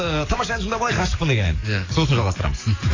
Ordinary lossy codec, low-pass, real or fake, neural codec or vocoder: AAC, 32 kbps; 7.2 kHz; fake; codec, 44.1 kHz, 7.8 kbps, DAC